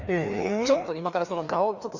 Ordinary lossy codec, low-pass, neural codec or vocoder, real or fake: none; 7.2 kHz; codec, 16 kHz, 2 kbps, FreqCodec, larger model; fake